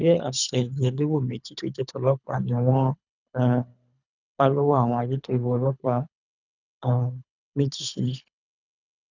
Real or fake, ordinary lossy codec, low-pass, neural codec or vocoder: fake; none; 7.2 kHz; codec, 24 kHz, 3 kbps, HILCodec